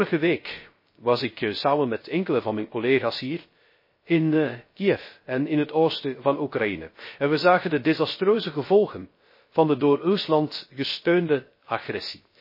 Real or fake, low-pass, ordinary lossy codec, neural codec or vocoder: fake; 5.4 kHz; MP3, 24 kbps; codec, 16 kHz, 0.3 kbps, FocalCodec